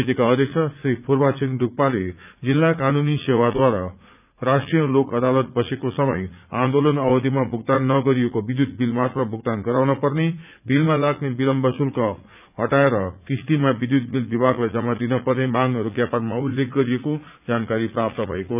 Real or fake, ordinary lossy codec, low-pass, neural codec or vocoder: fake; none; 3.6 kHz; vocoder, 44.1 kHz, 80 mel bands, Vocos